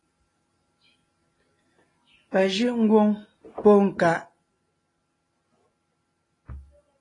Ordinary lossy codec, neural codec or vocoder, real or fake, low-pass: AAC, 32 kbps; none; real; 10.8 kHz